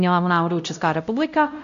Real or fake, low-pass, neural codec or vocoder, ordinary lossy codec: fake; 7.2 kHz; codec, 16 kHz, 0.5 kbps, X-Codec, WavLM features, trained on Multilingual LibriSpeech; AAC, 64 kbps